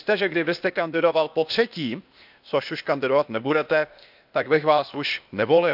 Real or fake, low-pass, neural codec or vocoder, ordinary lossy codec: fake; 5.4 kHz; codec, 16 kHz, 0.8 kbps, ZipCodec; none